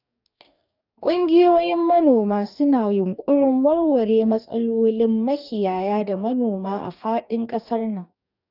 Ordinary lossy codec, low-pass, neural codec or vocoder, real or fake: none; 5.4 kHz; codec, 44.1 kHz, 2.6 kbps, DAC; fake